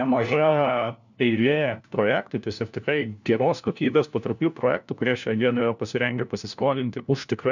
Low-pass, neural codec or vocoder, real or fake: 7.2 kHz; codec, 16 kHz, 1 kbps, FunCodec, trained on LibriTTS, 50 frames a second; fake